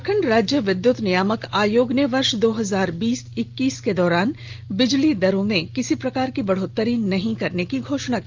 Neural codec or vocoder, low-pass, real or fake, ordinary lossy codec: none; 7.2 kHz; real; Opus, 32 kbps